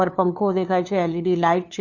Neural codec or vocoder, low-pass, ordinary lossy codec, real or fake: codec, 16 kHz, 2 kbps, FunCodec, trained on LibriTTS, 25 frames a second; 7.2 kHz; none; fake